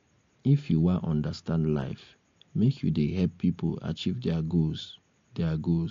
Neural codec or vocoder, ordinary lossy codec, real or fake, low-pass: none; AAC, 48 kbps; real; 7.2 kHz